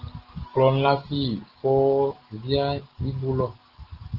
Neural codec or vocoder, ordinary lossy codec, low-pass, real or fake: none; Opus, 16 kbps; 5.4 kHz; real